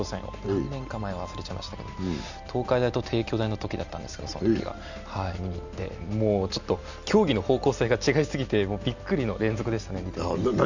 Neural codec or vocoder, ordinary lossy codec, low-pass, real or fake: none; none; 7.2 kHz; real